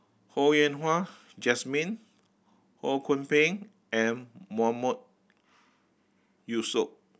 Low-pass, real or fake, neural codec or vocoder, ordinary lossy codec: none; real; none; none